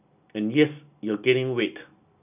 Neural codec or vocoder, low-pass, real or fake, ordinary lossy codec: none; 3.6 kHz; real; none